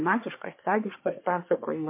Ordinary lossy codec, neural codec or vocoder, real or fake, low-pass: MP3, 24 kbps; codec, 24 kHz, 1 kbps, SNAC; fake; 3.6 kHz